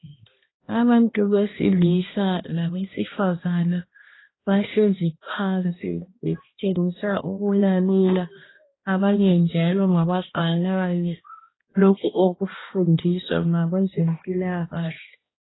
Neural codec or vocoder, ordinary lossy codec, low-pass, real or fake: codec, 16 kHz, 1 kbps, X-Codec, HuBERT features, trained on balanced general audio; AAC, 16 kbps; 7.2 kHz; fake